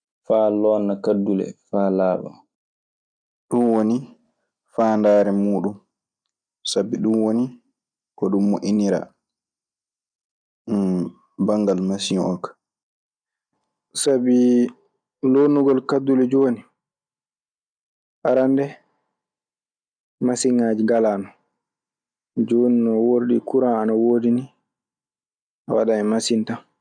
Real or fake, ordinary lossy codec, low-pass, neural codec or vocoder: real; none; 9.9 kHz; none